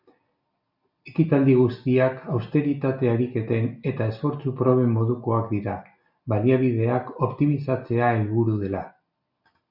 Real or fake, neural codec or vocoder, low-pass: real; none; 5.4 kHz